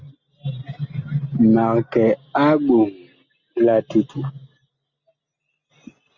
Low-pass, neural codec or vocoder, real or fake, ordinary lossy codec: 7.2 kHz; none; real; Opus, 64 kbps